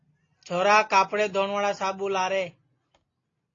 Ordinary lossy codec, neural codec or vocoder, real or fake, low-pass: AAC, 32 kbps; none; real; 7.2 kHz